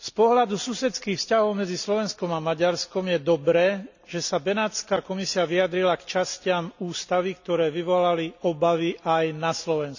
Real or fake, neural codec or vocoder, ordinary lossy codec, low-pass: real; none; none; 7.2 kHz